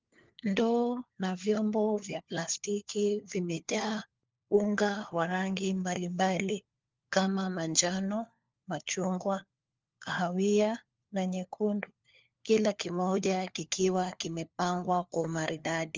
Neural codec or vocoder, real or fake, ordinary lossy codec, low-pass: codec, 16 kHz, 4 kbps, FunCodec, trained on LibriTTS, 50 frames a second; fake; Opus, 32 kbps; 7.2 kHz